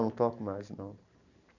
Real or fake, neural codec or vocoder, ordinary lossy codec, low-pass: fake; vocoder, 44.1 kHz, 128 mel bands every 512 samples, BigVGAN v2; none; 7.2 kHz